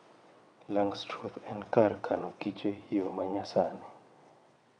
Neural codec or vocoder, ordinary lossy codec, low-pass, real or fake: vocoder, 22.05 kHz, 80 mel bands, WaveNeXt; none; 9.9 kHz; fake